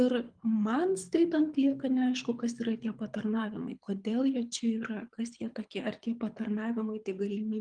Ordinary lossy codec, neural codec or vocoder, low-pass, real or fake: Opus, 32 kbps; codec, 24 kHz, 6 kbps, HILCodec; 9.9 kHz; fake